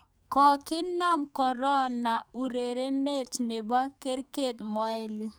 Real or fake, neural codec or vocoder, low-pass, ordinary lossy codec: fake; codec, 44.1 kHz, 2.6 kbps, SNAC; none; none